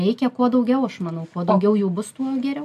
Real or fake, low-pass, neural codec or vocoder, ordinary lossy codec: real; 14.4 kHz; none; AAC, 96 kbps